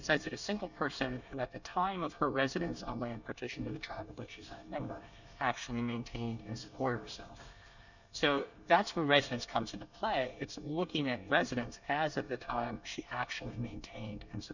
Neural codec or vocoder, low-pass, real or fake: codec, 24 kHz, 1 kbps, SNAC; 7.2 kHz; fake